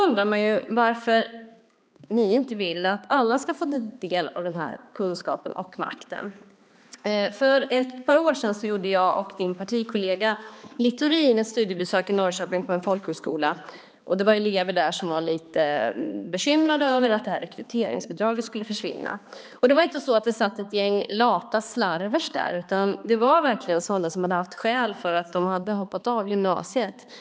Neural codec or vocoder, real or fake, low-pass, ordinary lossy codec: codec, 16 kHz, 2 kbps, X-Codec, HuBERT features, trained on balanced general audio; fake; none; none